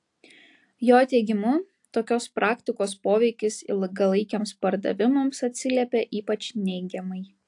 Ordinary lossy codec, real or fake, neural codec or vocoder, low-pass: AAC, 64 kbps; real; none; 10.8 kHz